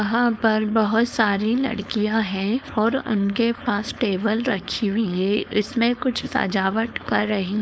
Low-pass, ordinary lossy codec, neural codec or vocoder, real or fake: none; none; codec, 16 kHz, 4.8 kbps, FACodec; fake